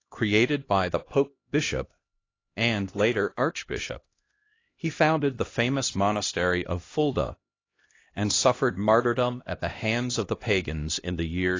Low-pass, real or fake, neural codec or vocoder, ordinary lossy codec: 7.2 kHz; fake; codec, 16 kHz, 1 kbps, X-Codec, HuBERT features, trained on LibriSpeech; AAC, 32 kbps